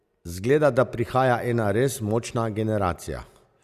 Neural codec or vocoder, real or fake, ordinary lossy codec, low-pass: none; real; Opus, 64 kbps; 14.4 kHz